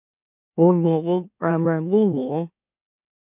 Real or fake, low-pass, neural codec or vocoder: fake; 3.6 kHz; autoencoder, 44.1 kHz, a latent of 192 numbers a frame, MeloTTS